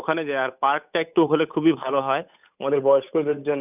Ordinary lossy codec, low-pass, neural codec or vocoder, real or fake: none; 3.6 kHz; codec, 16 kHz, 8 kbps, FunCodec, trained on Chinese and English, 25 frames a second; fake